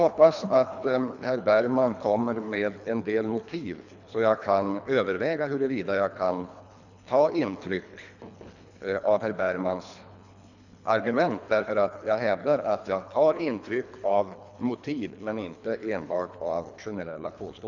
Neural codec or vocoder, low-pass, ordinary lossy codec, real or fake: codec, 24 kHz, 3 kbps, HILCodec; 7.2 kHz; none; fake